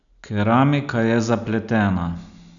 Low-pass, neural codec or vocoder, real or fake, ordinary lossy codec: 7.2 kHz; none; real; none